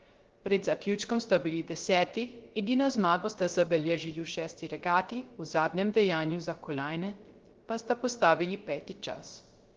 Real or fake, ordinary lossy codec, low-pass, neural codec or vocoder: fake; Opus, 16 kbps; 7.2 kHz; codec, 16 kHz, 0.3 kbps, FocalCodec